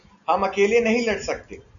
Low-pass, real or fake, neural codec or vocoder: 7.2 kHz; real; none